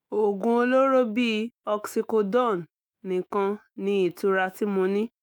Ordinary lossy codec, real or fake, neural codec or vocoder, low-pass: none; fake; autoencoder, 48 kHz, 128 numbers a frame, DAC-VAE, trained on Japanese speech; none